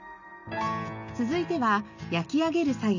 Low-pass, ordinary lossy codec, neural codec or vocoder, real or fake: 7.2 kHz; none; none; real